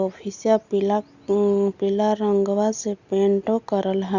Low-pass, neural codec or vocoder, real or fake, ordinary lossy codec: 7.2 kHz; none; real; Opus, 64 kbps